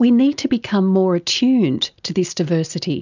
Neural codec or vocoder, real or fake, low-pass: none; real; 7.2 kHz